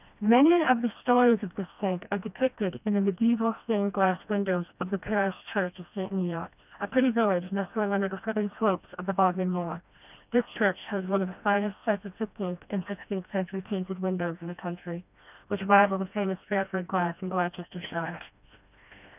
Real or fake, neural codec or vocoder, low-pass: fake; codec, 16 kHz, 1 kbps, FreqCodec, smaller model; 3.6 kHz